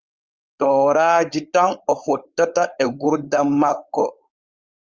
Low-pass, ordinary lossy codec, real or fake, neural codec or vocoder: 7.2 kHz; Opus, 32 kbps; fake; codec, 16 kHz, 4.8 kbps, FACodec